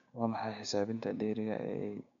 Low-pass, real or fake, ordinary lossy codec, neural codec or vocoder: 7.2 kHz; fake; MP3, 64 kbps; codec, 16 kHz, 6 kbps, DAC